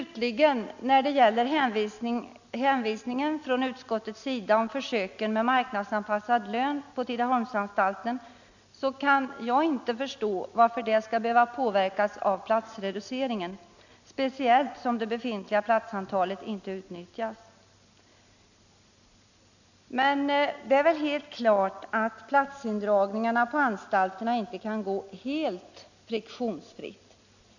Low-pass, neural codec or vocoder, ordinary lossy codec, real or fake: 7.2 kHz; none; none; real